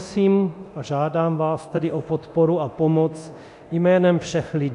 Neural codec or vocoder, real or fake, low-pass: codec, 24 kHz, 0.9 kbps, DualCodec; fake; 10.8 kHz